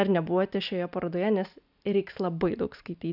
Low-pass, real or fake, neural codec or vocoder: 5.4 kHz; real; none